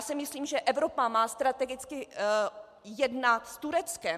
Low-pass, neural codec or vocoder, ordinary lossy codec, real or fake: 14.4 kHz; none; MP3, 96 kbps; real